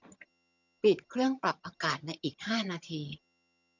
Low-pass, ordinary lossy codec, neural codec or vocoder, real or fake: 7.2 kHz; none; vocoder, 22.05 kHz, 80 mel bands, HiFi-GAN; fake